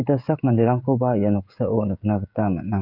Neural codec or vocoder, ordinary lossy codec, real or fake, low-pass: codec, 16 kHz, 16 kbps, FreqCodec, smaller model; none; fake; 5.4 kHz